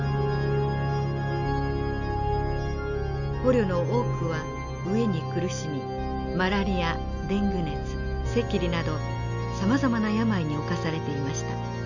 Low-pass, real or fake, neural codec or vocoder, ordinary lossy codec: 7.2 kHz; real; none; none